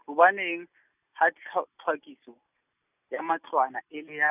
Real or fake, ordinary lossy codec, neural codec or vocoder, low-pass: real; none; none; 3.6 kHz